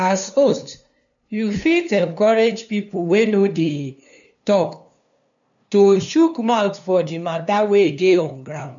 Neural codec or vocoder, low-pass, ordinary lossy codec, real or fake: codec, 16 kHz, 2 kbps, FunCodec, trained on LibriTTS, 25 frames a second; 7.2 kHz; none; fake